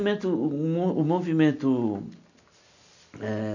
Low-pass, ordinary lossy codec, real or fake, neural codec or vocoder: 7.2 kHz; none; real; none